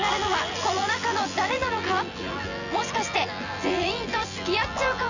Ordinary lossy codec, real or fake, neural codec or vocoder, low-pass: none; fake; vocoder, 24 kHz, 100 mel bands, Vocos; 7.2 kHz